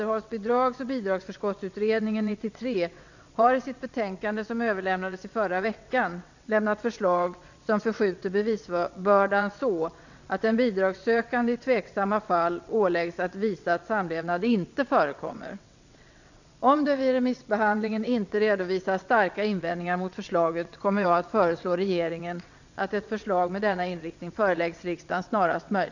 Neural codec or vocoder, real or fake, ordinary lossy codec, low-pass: vocoder, 22.05 kHz, 80 mel bands, WaveNeXt; fake; none; 7.2 kHz